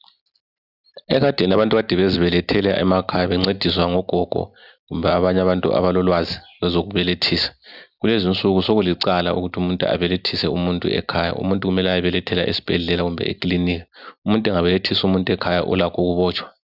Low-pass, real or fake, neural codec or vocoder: 5.4 kHz; real; none